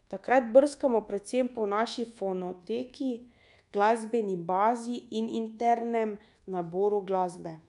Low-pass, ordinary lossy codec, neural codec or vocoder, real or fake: 10.8 kHz; none; codec, 24 kHz, 1.2 kbps, DualCodec; fake